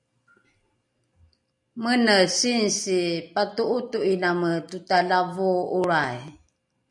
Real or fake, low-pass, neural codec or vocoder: real; 10.8 kHz; none